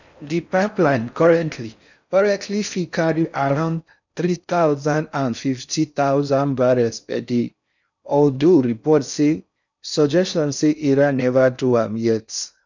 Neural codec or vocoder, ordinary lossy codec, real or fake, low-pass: codec, 16 kHz in and 24 kHz out, 0.6 kbps, FocalCodec, streaming, 4096 codes; none; fake; 7.2 kHz